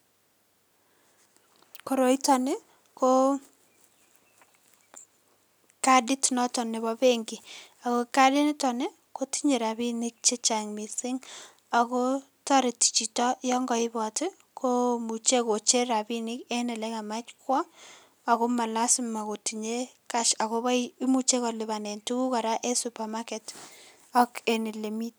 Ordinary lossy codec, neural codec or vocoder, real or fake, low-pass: none; none; real; none